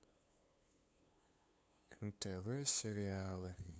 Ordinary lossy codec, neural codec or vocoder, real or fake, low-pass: none; codec, 16 kHz, 2 kbps, FunCodec, trained on LibriTTS, 25 frames a second; fake; none